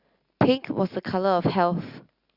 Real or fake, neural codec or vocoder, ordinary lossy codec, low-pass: real; none; Opus, 64 kbps; 5.4 kHz